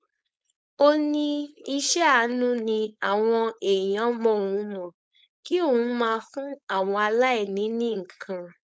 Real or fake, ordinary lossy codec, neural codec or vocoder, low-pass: fake; none; codec, 16 kHz, 4.8 kbps, FACodec; none